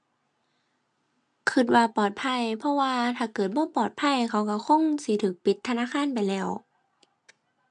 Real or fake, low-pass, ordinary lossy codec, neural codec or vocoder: real; 9.9 kHz; MP3, 64 kbps; none